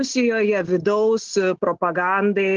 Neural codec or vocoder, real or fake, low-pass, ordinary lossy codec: none; real; 7.2 kHz; Opus, 16 kbps